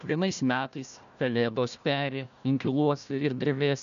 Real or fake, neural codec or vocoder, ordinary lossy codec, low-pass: fake; codec, 16 kHz, 1 kbps, FunCodec, trained on Chinese and English, 50 frames a second; MP3, 96 kbps; 7.2 kHz